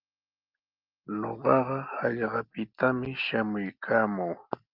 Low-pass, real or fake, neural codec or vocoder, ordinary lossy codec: 5.4 kHz; real; none; Opus, 32 kbps